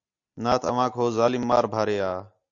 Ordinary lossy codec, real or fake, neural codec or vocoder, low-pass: AAC, 64 kbps; real; none; 7.2 kHz